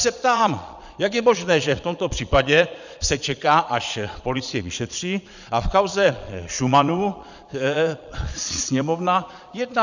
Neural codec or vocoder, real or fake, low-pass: vocoder, 22.05 kHz, 80 mel bands, Vocos; fake; 7.2 kHz